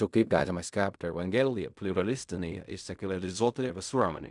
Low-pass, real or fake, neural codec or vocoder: 10.8 kHz; fake; codec, 16 kHz in and 24 kHz out, 0.4 kbps, LongCat-Audio-Codec, fine tuned four codebook decoder